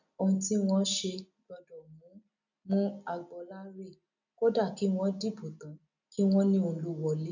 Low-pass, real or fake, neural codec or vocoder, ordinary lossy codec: 7.2 kHz; real; none; none